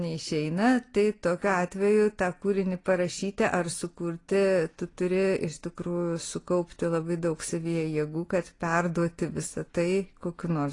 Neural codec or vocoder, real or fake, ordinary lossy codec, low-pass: none; real; AAC, 32 kbps; 10.8 kHz